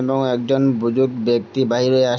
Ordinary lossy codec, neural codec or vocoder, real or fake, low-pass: none; none; real; none